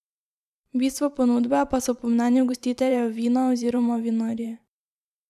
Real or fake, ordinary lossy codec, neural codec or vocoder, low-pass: fake; none; vocoder, 44.1 kHz, 128 mel bands every 512 samples, BigVGAN v2; 14.4 kHz